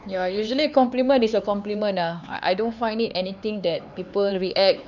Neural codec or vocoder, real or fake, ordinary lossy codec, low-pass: codec, 16 kHz, 4 kbps, X-Codec, HuBERT features, trained on LibriSpeech; fake; none; 7.2 kHz